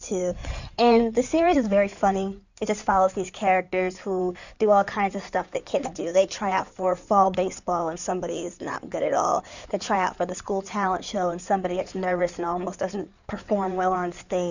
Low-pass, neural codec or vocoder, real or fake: 7.2 kHz; codec, 16 kHz in and 24 kHz out, 2.2 kbps, FireRedTTS-2 codec; fake